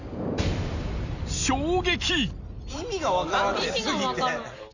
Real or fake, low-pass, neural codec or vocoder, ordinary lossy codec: real; 7.2 kHz; none; none